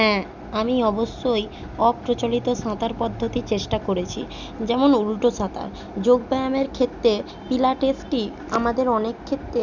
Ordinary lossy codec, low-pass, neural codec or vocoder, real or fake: none; 7.2 kHz; none; real